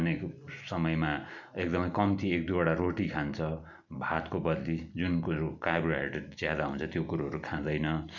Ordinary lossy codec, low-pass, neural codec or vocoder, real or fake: none; 7.2 kHz; none; real